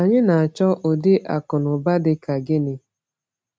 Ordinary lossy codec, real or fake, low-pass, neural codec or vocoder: none; real; none; none